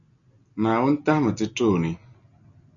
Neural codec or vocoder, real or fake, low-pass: none; real; 7.2 kHz